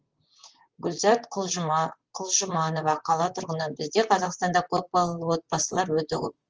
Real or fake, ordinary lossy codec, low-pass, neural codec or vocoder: fake; Opus, 24 kbps; 7.2 kHz; vocoder, 44.1 kHz, 128 mel bands, Pupu-Vocoder